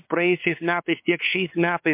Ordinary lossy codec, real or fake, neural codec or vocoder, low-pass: MP3, 32 kbps; fake; codec, 16 kHz, 4 kbps, X-Codec, WavLM features, trained on Multilingual LibriSpeech; 3.6 kHz